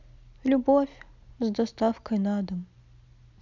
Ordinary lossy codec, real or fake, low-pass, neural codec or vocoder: none; real; 7.2 kHz; none